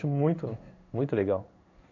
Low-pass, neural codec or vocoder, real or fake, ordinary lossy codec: 7.2 kHz; codec, 16 kHz in and 24 kHz out, 1 kbps, XY-Tokenizer; fake; none